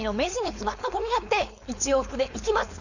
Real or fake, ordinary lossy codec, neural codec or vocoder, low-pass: fake; none; codec, 16 kHz, 4.8 kbps, FACodec; 7.2 kHz